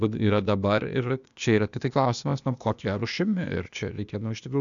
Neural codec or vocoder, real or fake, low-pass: codec, 16 kHz, 0.8 kbps, ZipCodec; fake; 7.2 kHz